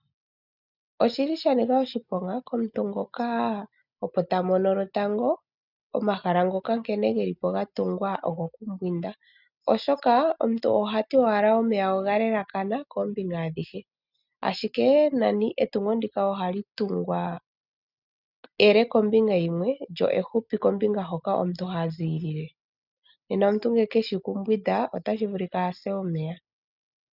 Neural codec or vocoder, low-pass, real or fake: none; 5.4 kHz; real